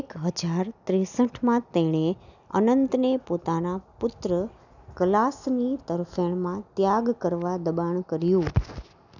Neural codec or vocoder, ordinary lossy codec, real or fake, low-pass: none; none; real; 7.2 kHz